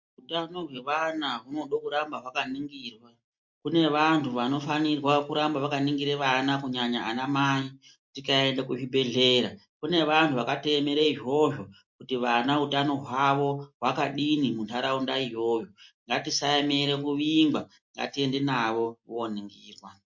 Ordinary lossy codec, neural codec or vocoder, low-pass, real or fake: MP3, 48 kbps; none; 7.2 kHz; real